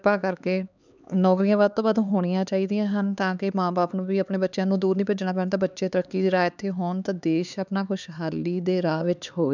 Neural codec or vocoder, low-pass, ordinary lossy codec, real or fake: codec, 16 kHz, 4 kbps, X-Codec, HuBERT features, trained on LibriSpeech; 7.2 kHz; none; fake